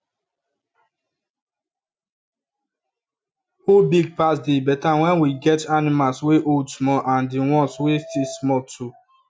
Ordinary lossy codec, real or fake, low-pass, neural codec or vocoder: none; real; none; none